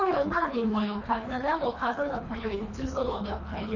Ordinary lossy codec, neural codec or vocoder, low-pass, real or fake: none; codec, 24 kHz, 3 kbps, HILCodec; 7.2 kHz; fake